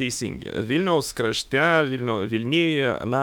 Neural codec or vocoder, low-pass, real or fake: autoencoder, 48 kHz, 32 numbers a frame, DAC-VAE, trained on Japanese speech; 19.8 kHz; fake